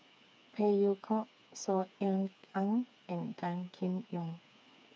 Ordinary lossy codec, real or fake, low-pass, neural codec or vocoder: none; fake; none; codec, 16 kHz, 4 kbps, FreqCodec, smaller model